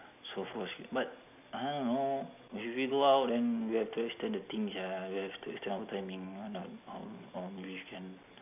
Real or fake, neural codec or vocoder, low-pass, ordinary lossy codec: real; none; 3.6 kHz; none